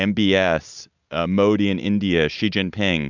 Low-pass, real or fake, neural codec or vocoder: 7.2 kHz; real; none